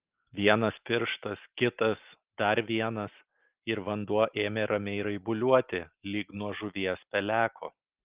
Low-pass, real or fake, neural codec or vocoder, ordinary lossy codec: 3.6 kHz; real; none; Opus, 32 kbps